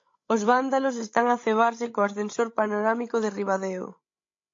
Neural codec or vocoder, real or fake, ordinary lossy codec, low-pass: codec, 16 kHz, 16 kbps, FreqCodec, larger model; fake; AAC, 48 kbps; 7.2 kHz